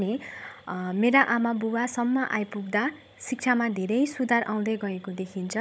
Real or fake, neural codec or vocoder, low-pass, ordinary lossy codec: fake; codec, 16 kHz, 16 kbps, FunCodec, trained on Chinese and English, 50 frames a second; none; none